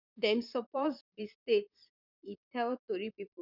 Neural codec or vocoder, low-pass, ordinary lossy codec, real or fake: vocoder, 44.1 kHz, 128 mel bands, Pupu-Vocoder; 5.4 kHz; none; fake